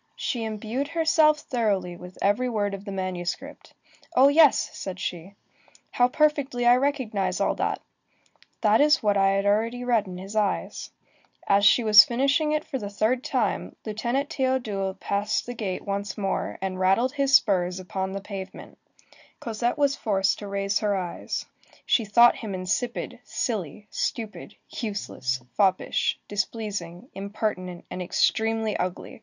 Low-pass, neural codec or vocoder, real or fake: 7.2 kHz; none; real